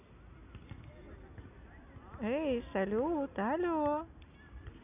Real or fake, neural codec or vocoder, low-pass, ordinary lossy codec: real; none; 3.6 kHz; none